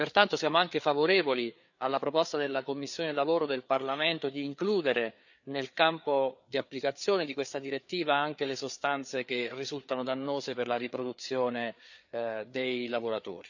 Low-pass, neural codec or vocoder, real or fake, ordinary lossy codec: 7.2 kHz; codec, 16 kHz, 4 kbps, FreqCodec, larger model; fake; none